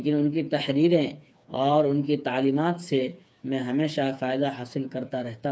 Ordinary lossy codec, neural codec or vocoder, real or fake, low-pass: none; codec, 16 kHz, 4 kbps, FreqCodec, smaller model; fake; none